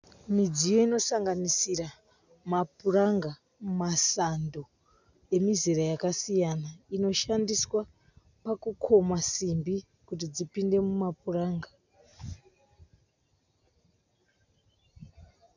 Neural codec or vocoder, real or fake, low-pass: none; real; 7.2 kHz